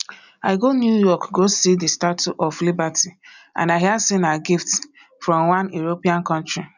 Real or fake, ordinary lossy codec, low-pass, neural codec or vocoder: real; none; 7.2 kHz; none